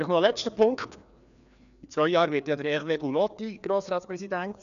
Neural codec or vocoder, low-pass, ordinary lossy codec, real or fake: codec, 16 kHz, 2 kbps, FreqCodec, larger model; 7.2 kHz; none; fake